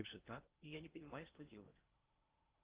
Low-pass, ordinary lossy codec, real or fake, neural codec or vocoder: 3.6 kHz; Opus, 32 kbps; fake; codec, 16 kHz in and 24 kHz out, 0.6 kbps, FocalCodec, streaming, 2048 codes